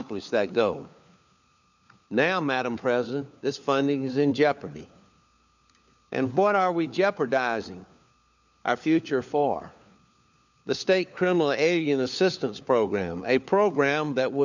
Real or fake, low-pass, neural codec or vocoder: fake; 7.2 kHz; codec, 16 kHz, 4 kbps, FunCodec, trained on LibriTTS, 50 frames a second